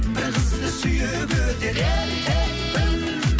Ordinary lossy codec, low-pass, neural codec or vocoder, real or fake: none; none; none; real